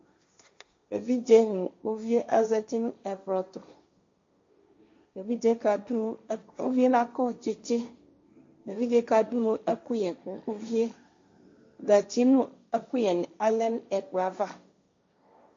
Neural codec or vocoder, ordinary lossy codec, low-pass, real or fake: codec, 16 kHz, 1.1 kbps, Voila-Tokenizer; MP3, 48 kbps; 7.2 kHz; fake